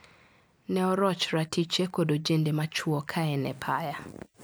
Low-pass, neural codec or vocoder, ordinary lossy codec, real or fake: none; none; none; real